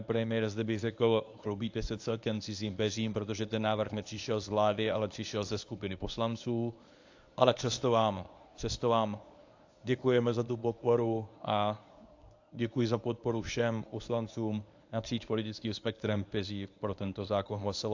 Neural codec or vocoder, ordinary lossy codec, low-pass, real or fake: codec, 24 kHz, 0.9 kbps, WavTokenizer, medium speech release version 1; AAC, 48 kbps; 7.2 kHz; fake